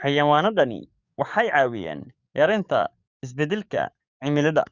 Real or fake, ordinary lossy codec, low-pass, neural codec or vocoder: fake; Opus, 64 kbps; 7.2 kHz; codec, 44.1 kHz, 7.8 kbps, DAC